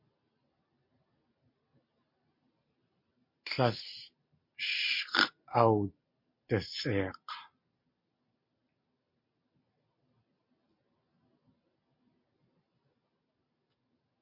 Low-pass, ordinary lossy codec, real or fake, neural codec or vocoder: 5.4 kHz; MP3, 32 kbps; real; none